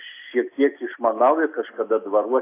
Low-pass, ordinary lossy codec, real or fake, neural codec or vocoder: 3.6 kHz; AAC, 24 kbps; real; none